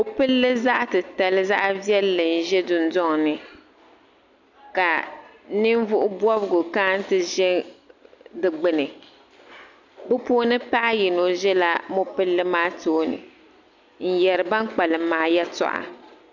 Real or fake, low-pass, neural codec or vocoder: real; 7.2 kHz; none